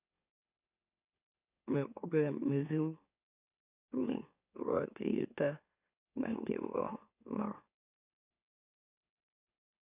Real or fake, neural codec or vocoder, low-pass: fake; autoencoder, 44.1 kHz, a latent of 192 numbers a frame, MeloTTS; 3.6 kHz